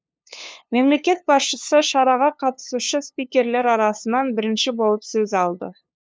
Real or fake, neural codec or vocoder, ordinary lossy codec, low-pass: fake; codec, 16 kHz, 2 kbps, FunCodec, trained on LibriTTS, 25 frames a second; none; none